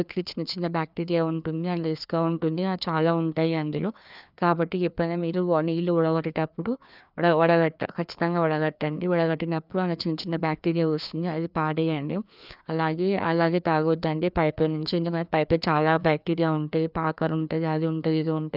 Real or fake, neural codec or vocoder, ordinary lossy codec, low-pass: fake; codec, 16 kHz, 2 kbps, FreqCodec, larger model; none; 5.4 kHz